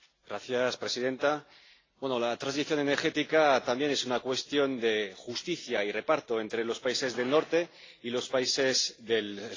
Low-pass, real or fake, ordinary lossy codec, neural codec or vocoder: 7.2 kHz; real; AAC, 32 kbps; none